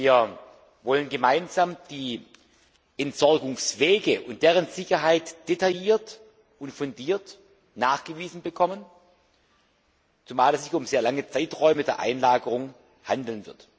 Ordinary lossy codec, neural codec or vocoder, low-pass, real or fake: none; none; none; real